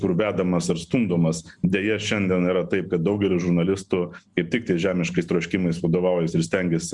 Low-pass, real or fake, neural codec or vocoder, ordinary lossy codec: 10.8 kHz; real; none; Opus, 64 kbps